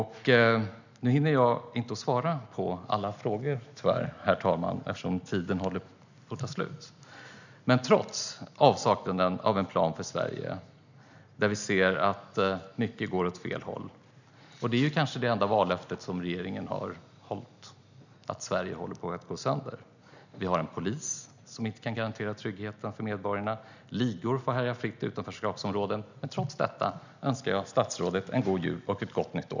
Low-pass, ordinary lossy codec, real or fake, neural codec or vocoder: 7.2 kHz; none; real; none